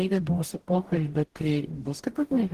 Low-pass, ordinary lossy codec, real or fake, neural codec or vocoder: 14.4 kHz; Opus, 16 kbps; fake; codec, 44.1 kHz, 0.9 kbps, DAC